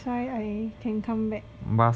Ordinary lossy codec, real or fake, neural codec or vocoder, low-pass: none; real; none; none